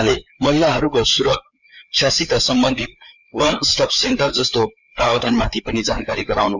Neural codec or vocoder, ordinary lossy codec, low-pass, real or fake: codec, 16 kHz, 4 kbps, FreqCodec, larger model; none; 7.2 kHz; fake